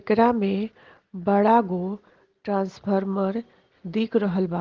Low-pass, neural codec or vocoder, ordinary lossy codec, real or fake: 7.2 kHz; none; Opus, 16 kbps; real